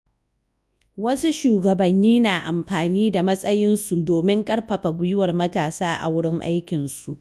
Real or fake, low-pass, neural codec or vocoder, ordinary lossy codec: fake; none; codec, 24 kHz, 0.9 kbps, WavTokenizer, large speech release; none